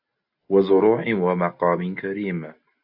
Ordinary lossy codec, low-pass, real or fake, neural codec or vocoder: AAC, 48 kbps; 5.4 kHz; real; none